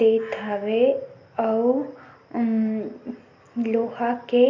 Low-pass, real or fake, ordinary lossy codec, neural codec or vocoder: 7.2 kHz; real; MP3, 48 kbps; none